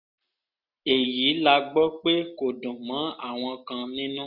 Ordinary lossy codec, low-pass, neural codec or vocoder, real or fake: none; 5.4 kHz; none; real